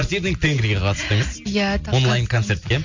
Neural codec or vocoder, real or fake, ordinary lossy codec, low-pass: none; real; MP3, 64 kbps; 7.2 kHz